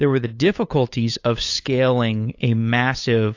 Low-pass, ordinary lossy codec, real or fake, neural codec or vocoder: 7.2 kHz; AAC, 48 kbps; fake; codec, 16 kHz, 8 kbps, FunCodec, trained on LibriTTS, 25 frames a second